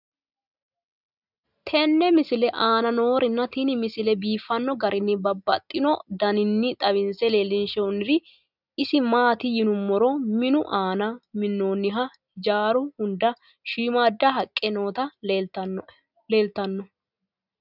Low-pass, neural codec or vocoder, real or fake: 5.4 kHz; none; real